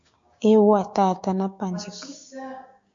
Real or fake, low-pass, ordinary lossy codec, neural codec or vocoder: fake; 7.2 kHz; MP3, 48 kbps; codec, 16 kHz, 6 kbps, DAC